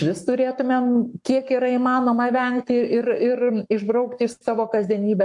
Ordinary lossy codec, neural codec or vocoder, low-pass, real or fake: AAC, 64 kbps; codec, 44.1 kHz, 7.8 kbps, Pupu-Codec; 10.8 kHz; fake